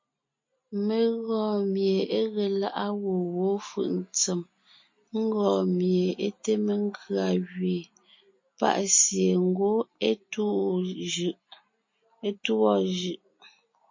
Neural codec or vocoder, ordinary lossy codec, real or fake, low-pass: none; MP3, 32 kbps; real; 7.2 kHz